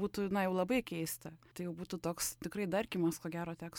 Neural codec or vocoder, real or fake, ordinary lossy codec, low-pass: none; real; MP3, 96 kbps; 19.8 kHz